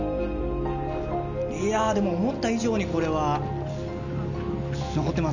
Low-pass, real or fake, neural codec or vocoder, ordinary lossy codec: 7.2 kHz; real; none; none